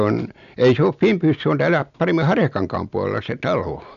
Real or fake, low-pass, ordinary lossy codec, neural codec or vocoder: real; 7.2 kHz; none; none